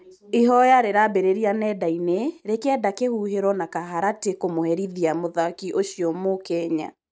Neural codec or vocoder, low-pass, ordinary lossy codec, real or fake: none; none; none; real